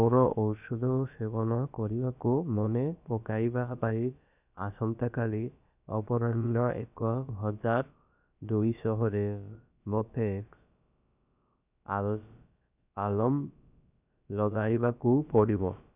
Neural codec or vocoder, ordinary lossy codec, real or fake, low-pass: codec, 16 kHz, about 1 kbps, DyCAST, with the encoder's durations; none; fake; 3.6 kHz